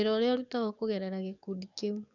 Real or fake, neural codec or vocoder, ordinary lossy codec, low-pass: fake; codec, 44.1 kHz, 7.8 kbps, DAC; none; 7.2 kHz